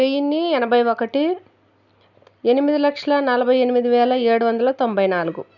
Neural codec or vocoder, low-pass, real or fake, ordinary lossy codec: none; 7.2 kHz; real; none